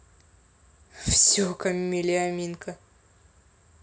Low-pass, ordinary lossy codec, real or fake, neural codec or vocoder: none; none; real; none